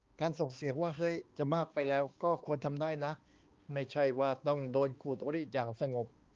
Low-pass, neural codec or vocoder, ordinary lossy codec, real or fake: 7.2 kHz; codec, 16 kHz, 2 kbps, X-Codec, HuBERT features, trained on balanced general audio; Opus, 32 kbps; fake